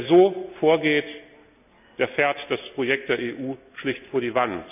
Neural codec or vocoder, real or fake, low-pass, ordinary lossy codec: none; real; 3.6 kHz; none